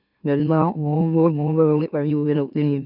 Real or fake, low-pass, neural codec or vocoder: fake; 5.4 kHz; autoencoder, 44.1 kHz, a latent of 192 numbers a frame, MeloTTS